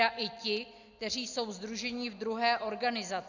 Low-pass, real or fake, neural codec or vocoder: 7.2 kHz; real; none